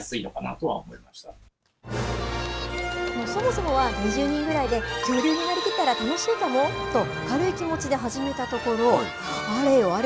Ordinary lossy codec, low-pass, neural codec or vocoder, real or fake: none; none; none; real